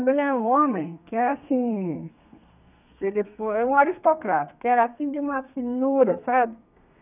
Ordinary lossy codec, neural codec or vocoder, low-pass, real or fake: none; codec, 32 kHz, 1.9 kbps, SNAC; 3.6 kHz; fake